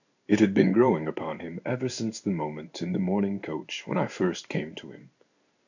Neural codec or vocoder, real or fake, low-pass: codec, 16 kHz in and 24 kHz out, 1 kbps, XY-Tokenizer; fake; 7.2 kHz